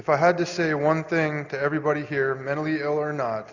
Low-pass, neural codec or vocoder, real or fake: 7.2 kHz; none; real